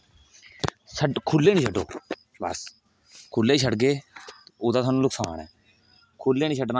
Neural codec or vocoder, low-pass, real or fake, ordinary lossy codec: none; none; real; none